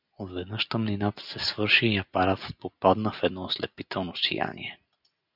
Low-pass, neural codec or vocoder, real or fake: 5.4 kHz; none; real